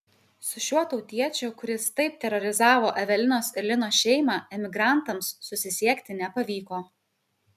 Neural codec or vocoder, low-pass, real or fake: none; 14.4 kHz; real